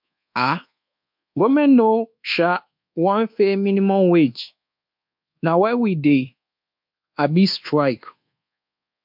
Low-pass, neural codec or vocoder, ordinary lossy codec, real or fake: 5.4 kHz; codec, 24 kHz, 1.2 kbps, DualCodec; MP3, 48 kbps; fake